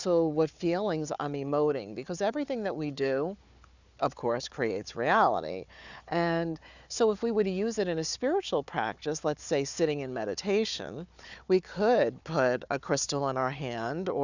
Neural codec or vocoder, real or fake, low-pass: codec, 16 kHz, 4 kbps, FunCodec, trained on Chinese and English, 50 frames a second; fake; 7.2 kHz